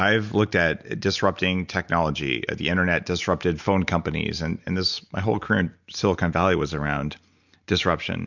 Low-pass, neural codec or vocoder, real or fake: 7.2 kHz; none; real